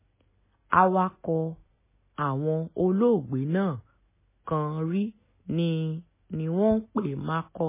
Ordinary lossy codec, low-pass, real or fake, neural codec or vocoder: MP3, 16 kbps; 3.6 kHz; real; none